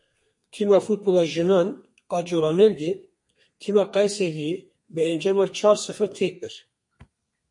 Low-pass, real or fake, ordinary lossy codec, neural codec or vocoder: 10.8 kHz; fake; MP3, 48 kbps; codec, 32 kHz, 1.9 kbps, SNAC